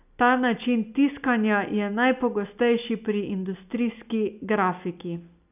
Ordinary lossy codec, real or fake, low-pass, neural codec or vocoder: none; real; 3.6 kHz; none